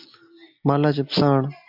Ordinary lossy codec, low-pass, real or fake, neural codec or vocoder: MP3, 48 kbps; 5.4 kHz; real; none